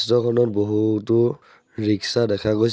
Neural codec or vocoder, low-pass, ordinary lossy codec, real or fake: none; none; none; real